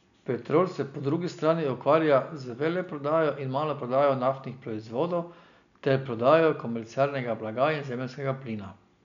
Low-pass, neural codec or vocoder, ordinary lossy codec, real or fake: 7.2 kHz; none; MP3, 96 kbps; real